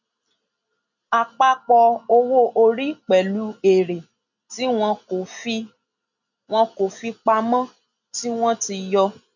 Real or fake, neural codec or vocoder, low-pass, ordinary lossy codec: real; none; 7.2 kHz; none